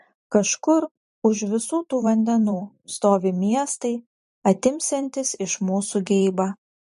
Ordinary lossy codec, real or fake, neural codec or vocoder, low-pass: MP3, 48 kbps; fake; vocoder, 44.1 kHz, 128 mel bands every 512 samples, BigVGAN v2; 14.4 kHz